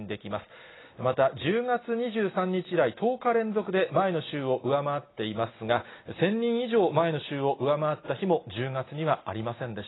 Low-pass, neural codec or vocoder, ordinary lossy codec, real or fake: 7.2 kHz; none; AAC, 16 kbps; real